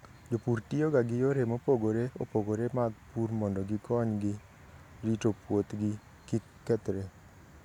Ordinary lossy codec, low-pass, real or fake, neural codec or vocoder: none; 19.8 kHz; real; none